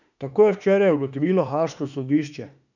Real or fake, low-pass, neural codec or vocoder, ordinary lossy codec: fake; 7.2 kHz; autoencoder, 48 kHz, 32 numbers a frame, DAC-VAE, trained on Japanese speech; none